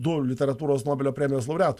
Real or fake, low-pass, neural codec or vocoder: real; 14.4 kHz; none